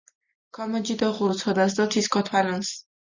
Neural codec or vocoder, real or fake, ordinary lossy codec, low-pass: none; real; Opus, 64 kbps; 7.2 kHz